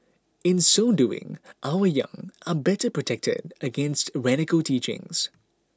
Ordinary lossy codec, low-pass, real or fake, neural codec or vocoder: none; none; real; none